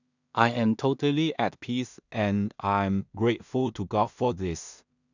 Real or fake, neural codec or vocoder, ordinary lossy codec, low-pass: fake; codec, 16 kHz in and 24 kHz out, 0.4 kbps, LongCat-Audio-Codec, two codebook decoder; none; 7.2 kHz